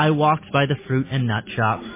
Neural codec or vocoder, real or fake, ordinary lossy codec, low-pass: none; real; MP3, 16 kbps; 3.6 kHz